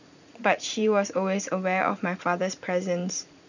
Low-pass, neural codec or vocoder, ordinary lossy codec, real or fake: 7.2 kHz; vocoder, 44.1 kHz, 80 mel bands, Vocos; none; fake